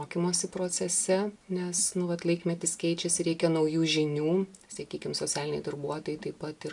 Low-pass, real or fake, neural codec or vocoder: 10.8 kHz; real; none